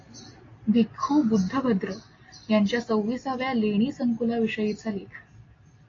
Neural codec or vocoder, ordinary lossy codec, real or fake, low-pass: none; AAC, 32 kbps; real; 7.2 kHz